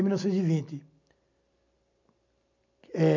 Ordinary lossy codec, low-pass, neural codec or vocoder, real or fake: none; 7.2 kHz; none; real